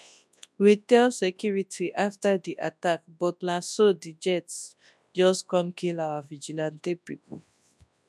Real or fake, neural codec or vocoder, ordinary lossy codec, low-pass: fake; codec, 24 kHz, 0.9 kbps, WavTokenizer, large speech release; none; none